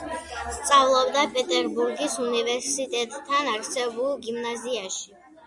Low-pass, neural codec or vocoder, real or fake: 10.8 kHz; none; real